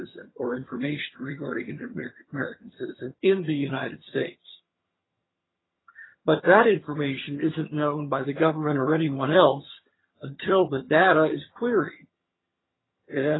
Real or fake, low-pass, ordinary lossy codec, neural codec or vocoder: fake; 7.2 kHz; AAC, 16 kbps; vocoder, 22.05 kHz, 80 mel bands, HiFi-GAN